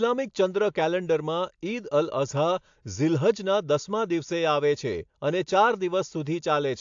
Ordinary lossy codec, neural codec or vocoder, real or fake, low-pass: AAC, 64 kbps; none; real; 7.2 kHz